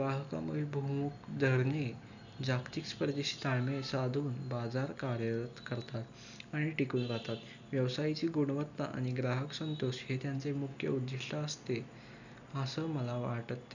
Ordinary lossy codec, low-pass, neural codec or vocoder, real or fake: none; 7.2 kHz; none; real